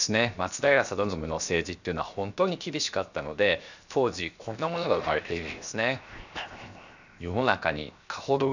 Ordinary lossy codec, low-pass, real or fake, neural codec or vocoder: none; 7.2 kHz; fake; codec, 16 kHz, 0.7 kbps, FocalCodec